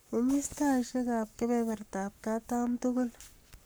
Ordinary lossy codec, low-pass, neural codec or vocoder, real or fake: none; none; codec, 44.1 kHz, 7.8 kbps, Pupu-Codec; fake